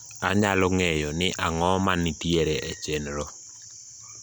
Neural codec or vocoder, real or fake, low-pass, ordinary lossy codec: none; real; none; none